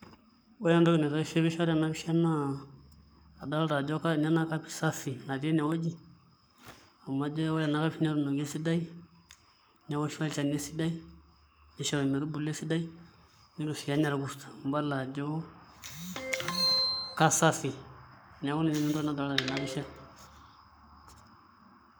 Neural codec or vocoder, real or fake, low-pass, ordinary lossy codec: codec, 44.1 kHz, 7.8 kbps, Pupu-Codec; fake; none; none